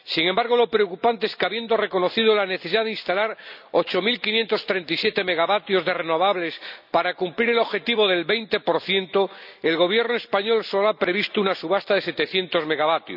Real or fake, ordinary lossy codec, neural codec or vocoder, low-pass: real; none; none; 5.4 kHz